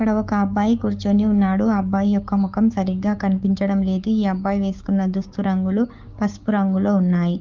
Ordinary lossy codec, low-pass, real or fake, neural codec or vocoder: Opus, 24 kbps; 7.2 kHz; fake; codec, 16 kHz, 6 kbps, DAC